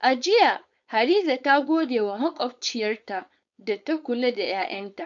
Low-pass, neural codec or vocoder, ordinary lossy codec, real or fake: 7.2 kHz; codec, 16 kHz, 4.8 kbps, FACodec; MP3, 96 kbps; fake